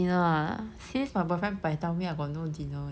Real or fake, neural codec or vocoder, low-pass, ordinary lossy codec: real; none; none; none